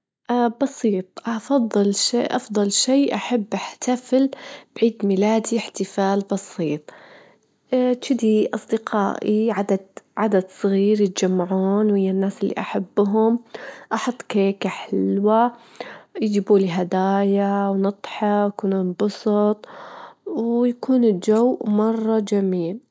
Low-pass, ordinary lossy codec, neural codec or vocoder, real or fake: none; none; none; real